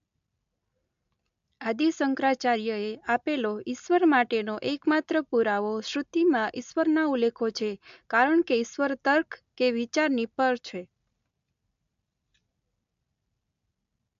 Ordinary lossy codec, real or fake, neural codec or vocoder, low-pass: AAC, 64 kbps; real; none; 7.2 kHz